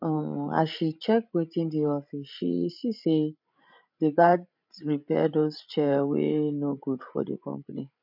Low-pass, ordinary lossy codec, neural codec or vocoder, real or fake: 5.4 kHz; none; codec, 16 kHz, 16 kbps, FreqCodec, larger model; fake